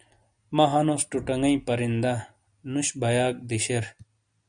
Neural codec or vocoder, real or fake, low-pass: none; real; 9.9 kHz